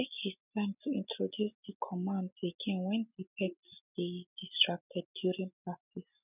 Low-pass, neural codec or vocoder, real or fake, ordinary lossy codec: 3.6 kHz; none; real; none